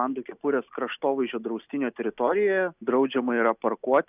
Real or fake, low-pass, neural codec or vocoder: real; 3.6 kHz; none